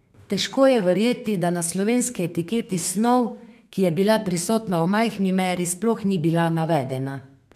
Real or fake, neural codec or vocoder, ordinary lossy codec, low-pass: fake; codec, 32 kHz, 1.9 kbps, SNAC; none; 14.4 kHz